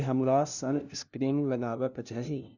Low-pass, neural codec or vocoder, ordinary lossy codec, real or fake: 7.2 kHz; codec, 16 kHz, 0.5 kbps, FunCodec, trained on LibriTTS, 25 frames a second; none; fake